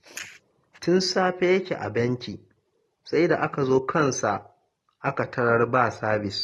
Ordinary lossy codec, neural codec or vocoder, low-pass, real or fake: AAC, 32 kbps; none; 19.8 kHz; real